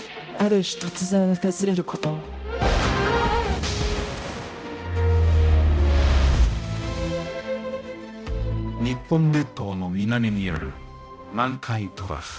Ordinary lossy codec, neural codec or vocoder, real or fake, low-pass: none; codec, 16 kHz, 0.5 kbps, X-Codec, HuBERT features, trained on balanced general audio; fake; none